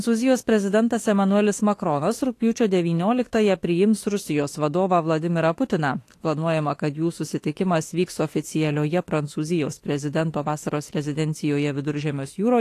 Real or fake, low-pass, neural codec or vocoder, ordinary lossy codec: fake; 14.4 kHz; autoencoder, 48 kHz, 32 numbers a frame, DAC-VAE, trained on Japanese speech; AAC, 48 kbps